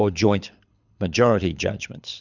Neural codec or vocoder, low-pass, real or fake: codec, 24 kHz, 6 kbps, HILCodec; 7.2 kHz; fake